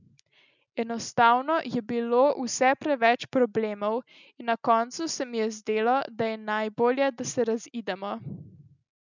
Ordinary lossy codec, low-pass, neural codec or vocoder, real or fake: none; 7.2 kHz; none; real